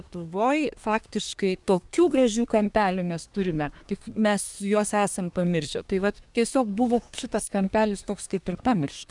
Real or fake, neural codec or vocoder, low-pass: fake; codec, 24 kHz, 1 kbps, SNAC; 10.8 kHz